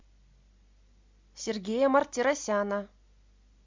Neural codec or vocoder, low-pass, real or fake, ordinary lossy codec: none; 7.2 kHz; real; MP3, 64 kbps